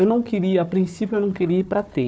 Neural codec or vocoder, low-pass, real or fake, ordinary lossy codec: codec, 16 kHz, 4 kbps, FunCodec, trained on Chinese and English, 50 frames a second; none; fake; none